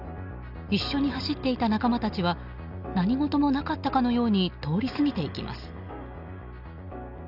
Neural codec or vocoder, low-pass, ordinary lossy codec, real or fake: none; 5.4 kHz; Opus, 64 kbps; real